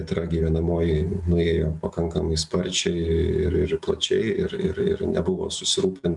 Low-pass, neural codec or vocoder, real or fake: 10.8 kHz; none; real